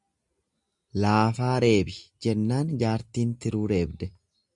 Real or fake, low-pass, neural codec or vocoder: real; 10.8 kHz; none